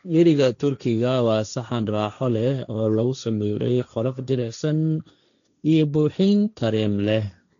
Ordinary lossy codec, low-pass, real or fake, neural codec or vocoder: none; 7.2 kHz; fake; codec, 16 kHz, 1.1 kbps, Voila-Tokenizer